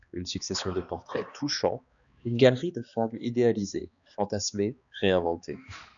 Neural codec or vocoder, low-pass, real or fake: codec, 16 kHz, 2 kbps, X-Codec, HuBERT features, trained on balanced general audio; 7.2 kHz; fake